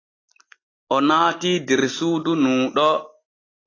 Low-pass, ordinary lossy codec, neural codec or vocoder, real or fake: 7.2 kHz; AAC, 32 kbps; none; real